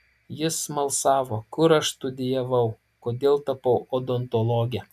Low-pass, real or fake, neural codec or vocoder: 14.4 kHz; real; none